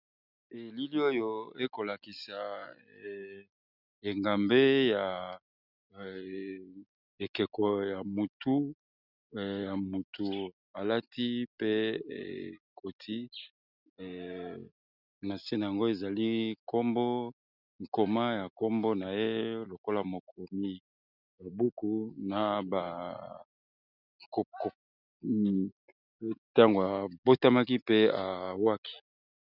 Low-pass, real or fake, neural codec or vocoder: 5.4 kHz; real; none